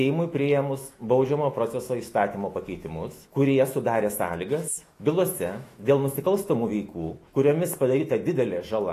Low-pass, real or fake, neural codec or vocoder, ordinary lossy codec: 14.4 kHz; fake; autoencoder, 48 kHz, 128 numbers a frame, DAC-VAE, trained on Japanese speech; AAC, 48 kbps